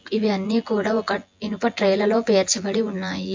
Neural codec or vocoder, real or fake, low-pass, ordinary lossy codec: vocoder, 24 kHz, 100 mel bands, Vocos; fake; 7.2 kHz; MP3, 48 kbps